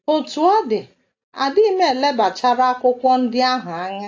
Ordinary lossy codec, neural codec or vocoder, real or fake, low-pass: AAC, 48 kbps; none; real; 7.2 kHz